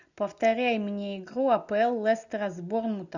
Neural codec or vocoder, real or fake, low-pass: none; real; 7.2 kHz